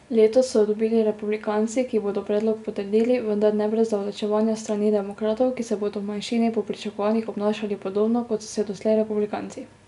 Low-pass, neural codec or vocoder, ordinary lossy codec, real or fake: 10.8 kHz; none; none; real